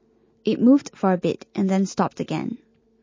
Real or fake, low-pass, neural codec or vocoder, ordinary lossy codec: real; 7.2 kHz; none; MP3, 32 kbps